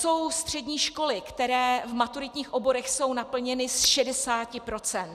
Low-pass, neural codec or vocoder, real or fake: 14.4 kHz; none; real